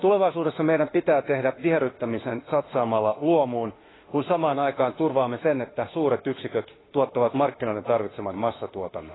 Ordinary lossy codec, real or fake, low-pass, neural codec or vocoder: AAC, 16 kbps; fake; 7.2 kHz; autoencoder, 48 kHz, 32 numbers a frame, DAC-VAE, trained on Japanese speech